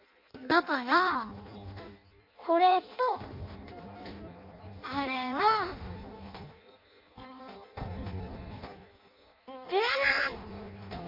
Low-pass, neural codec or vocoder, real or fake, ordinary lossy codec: 5.4 kHz; codec, 16 kHz in and 24 kHz out, 0.6 kbps, FireRedTTS-2 codec; fake; MP3, 32 kbps